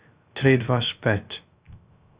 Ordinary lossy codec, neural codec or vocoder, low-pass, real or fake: Opus, 64 kbps; codec, 16 kHz, 0.3 kbps, FocalCodec; 3.6 kHz; fake